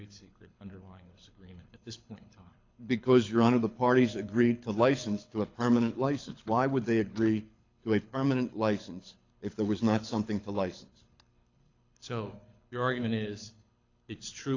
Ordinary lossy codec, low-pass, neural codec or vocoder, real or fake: AAC, 48 kbps; 7.2 kHz; codec, 24 kHz, 6 kbps, HILCodec; fake